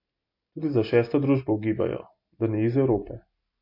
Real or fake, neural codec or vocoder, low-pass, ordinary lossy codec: real; none; 5.4 kHz; MP3, 32 kbps